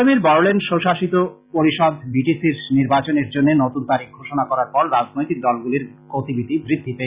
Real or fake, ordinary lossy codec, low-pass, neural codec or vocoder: real; Opus, 64 kbps; 3.6 kHz; none